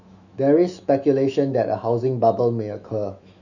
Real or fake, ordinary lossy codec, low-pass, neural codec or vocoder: fake; none; 7.2 kHz; autoencoder, 48 kHz, 128 numbers a frame, DAC-VAE, trained on Japanese speech